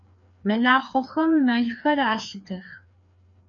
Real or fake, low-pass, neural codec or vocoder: fake; 7.2 kHz; codec, 16 kHz, 2 kbps, FreqCodec, larger model